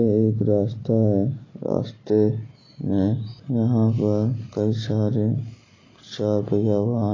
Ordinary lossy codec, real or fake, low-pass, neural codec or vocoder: AAC, 32 kbps; real; 7.2 kHz; none